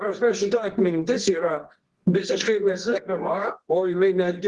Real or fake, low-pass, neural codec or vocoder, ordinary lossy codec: fake; 10.8 kHz; codec, 24 kHz, 0.9 kbps, WavTokenizer, medium music audio release; Opus, 16 kbps